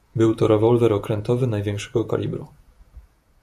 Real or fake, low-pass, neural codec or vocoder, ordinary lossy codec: fake; 14.4 kHz; vocoder, 48 kHz, 128 mel bands, Vocos; AAC, 96 kbps